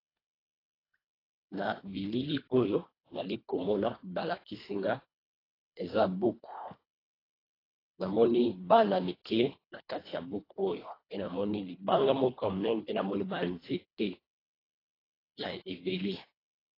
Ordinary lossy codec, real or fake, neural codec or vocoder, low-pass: AAC, 24 kbps; fake; codec, 24 kHz, 1.5 kbps, HILCodec; 5.4 kHz